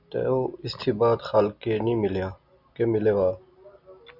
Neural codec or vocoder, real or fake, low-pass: none; real; 5.4 kHz